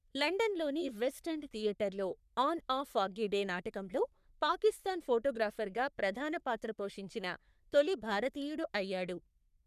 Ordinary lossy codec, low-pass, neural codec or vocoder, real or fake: none; 14.4 kHz; codec, 44.1 kHz, 3.4 kbps, Pupu-Codec; fake